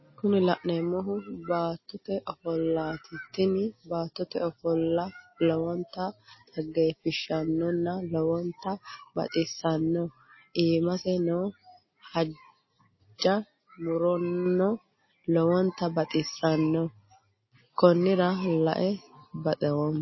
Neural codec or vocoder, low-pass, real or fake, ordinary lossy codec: none; 7.2 kHz; real; MP3, 24 kbps